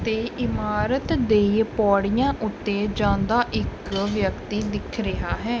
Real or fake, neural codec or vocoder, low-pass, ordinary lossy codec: real; none; none; none